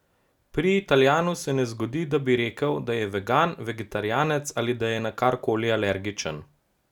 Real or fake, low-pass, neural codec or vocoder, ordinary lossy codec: real; 19.8 kHz; none; none